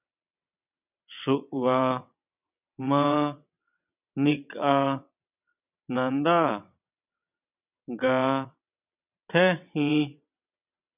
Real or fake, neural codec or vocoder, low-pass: fake; vocoder, 22.05 kHz, 80 mel bands, WaveNeXt; 3.6 kHz